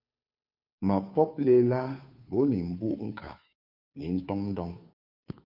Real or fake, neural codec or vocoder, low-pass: fake; codec, 16 kHz, 2 kbps, FunCodec, trained on Chinese and English, 25 frames a second; 5.4 kHz